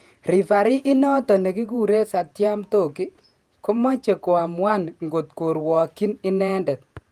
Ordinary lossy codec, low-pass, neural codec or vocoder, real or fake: Opus, 32 kbps; 14.4 kHz; vocoder, 48 kHz, 128 mel bands, Vocos; fake